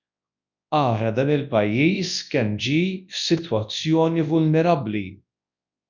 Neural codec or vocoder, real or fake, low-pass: codec, 24 kHz, 0.9 kbps, WavTokenizer, large speech release; fake; 7.2 kHz